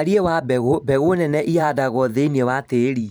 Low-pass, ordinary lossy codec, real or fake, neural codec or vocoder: none; none; fake; vocoder, 44.1 kHz, 128 mel bands every 512 samples, BigVGAN v2